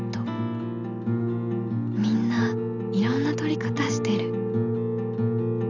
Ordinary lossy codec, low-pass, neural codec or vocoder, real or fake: none; 7.2 kHz; none; real